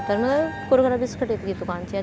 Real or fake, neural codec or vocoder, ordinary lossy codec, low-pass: real; none; none; none